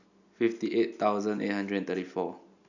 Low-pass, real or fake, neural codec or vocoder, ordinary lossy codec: 7.2 kHz; real; none; none